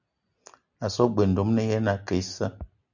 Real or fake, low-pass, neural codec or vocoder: real; 7.2 kHz; none